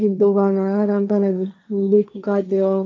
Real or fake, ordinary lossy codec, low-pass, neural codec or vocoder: fake; none; none; codec, 16 kHz, 1.1 kbps, Voila-Tokenizer